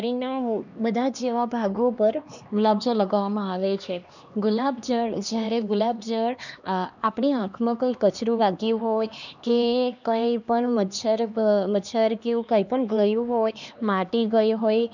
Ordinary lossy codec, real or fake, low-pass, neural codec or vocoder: none; fake; 7.2 kHz; codec, 16 kHz, 2 kbps, X-Codec, HuBERT features, trained on LibriSpeech